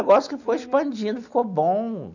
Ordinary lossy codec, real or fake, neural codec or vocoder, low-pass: none; real; none; 7.2 kHz